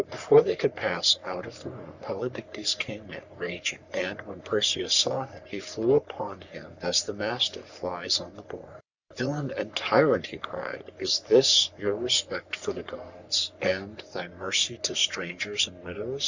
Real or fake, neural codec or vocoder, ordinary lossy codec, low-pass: fake; codec, 44.1 kHz, 3.4 kbps, Pupu-Codec; Opus, 64 kbps; 7.2 kHz